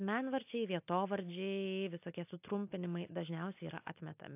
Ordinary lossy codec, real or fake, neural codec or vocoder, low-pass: AAC, 32 kbps; real; none; 3.6 kHz